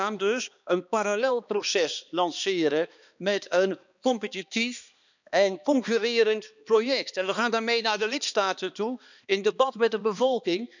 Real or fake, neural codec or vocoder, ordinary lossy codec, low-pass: fake; codec, 16 kHz, 2 kbps, X-Codec, HuBERT features, trained on balanced general audio; none; 7.2 kHz